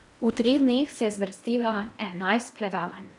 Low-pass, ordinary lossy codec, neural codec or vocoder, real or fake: 10.8 kHz; none; codec, 16 kHz in and 24 kHz out, 0.8 kbps, FocalCodec, streaming, 65536 codes; fake